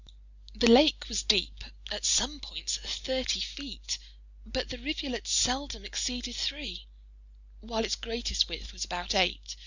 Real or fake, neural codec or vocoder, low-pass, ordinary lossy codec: real; none; 7.2 kHz; Opus, 64 kbps